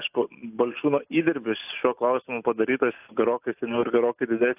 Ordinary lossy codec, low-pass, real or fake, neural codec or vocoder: AAC, 32 kbps; 3.6 kHz; real; none